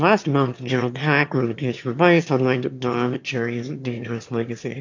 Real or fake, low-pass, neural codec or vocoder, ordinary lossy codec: fake; 7.2 kHz; autoencoder, 22.05 kHz, a latent of 192 numbers a frame, VITS, trained on one speaker; AAC, 48 kbps